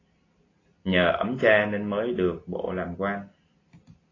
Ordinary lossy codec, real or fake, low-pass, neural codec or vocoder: AAC, 32 kbps; real; 7.2 kHz; none